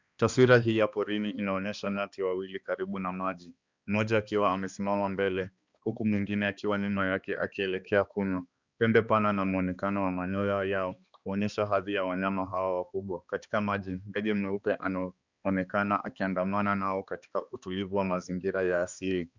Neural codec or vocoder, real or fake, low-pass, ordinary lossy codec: codec, 16 kHz, 2 kbps, X-Codec, HuBERT features, trained on balanced general audio; fake; 7.2 kHz; Opus, 64 kbps